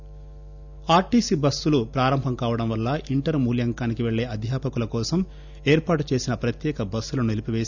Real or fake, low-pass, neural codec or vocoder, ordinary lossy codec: real; 7.2 kHz; none; none